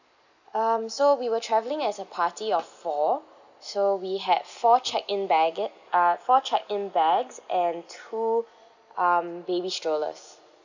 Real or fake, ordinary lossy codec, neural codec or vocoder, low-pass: real; none; none; 7.2 kHz